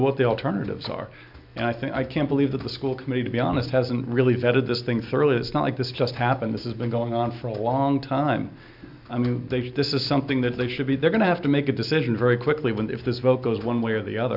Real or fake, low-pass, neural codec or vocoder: real; 5.4 kHz; none